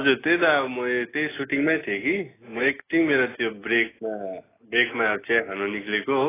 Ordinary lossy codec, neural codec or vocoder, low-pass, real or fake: AAC, 16 kbps; none; 3.6 kHz; real